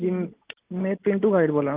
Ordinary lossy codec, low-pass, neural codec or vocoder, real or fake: Opus, 24 kbps; 3.6 kHz; none; real